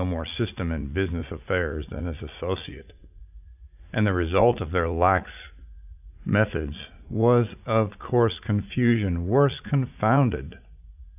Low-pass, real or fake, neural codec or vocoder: 3.6 kHz; fake; vocoder, 44.1 kHz, 80 mel bands, Vocos